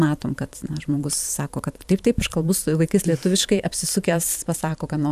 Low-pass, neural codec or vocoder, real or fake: 14.4 kHz; vocoder, 44.1 kHz, 128 mel bands every 512 samples, BigVGAN v2; fake